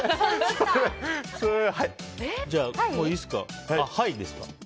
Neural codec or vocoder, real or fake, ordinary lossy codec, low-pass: none; real; none; none